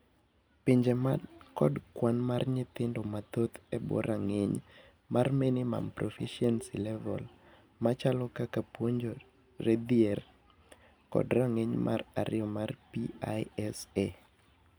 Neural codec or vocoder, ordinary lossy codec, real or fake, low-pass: none; none; real; none